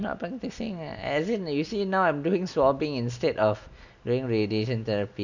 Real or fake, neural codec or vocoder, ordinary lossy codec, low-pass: real; none; none; 7.2 kHz